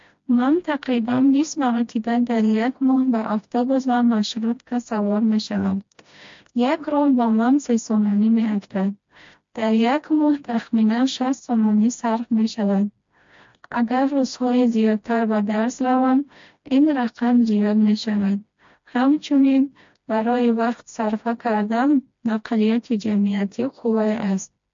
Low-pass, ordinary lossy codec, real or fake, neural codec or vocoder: 7.2 kHz; MP3, 48 kbps; fake; codec, 16 kHz, 1 kbps, FreqCodec, smaller model